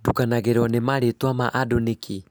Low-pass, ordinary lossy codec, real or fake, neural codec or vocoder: none; none; real; none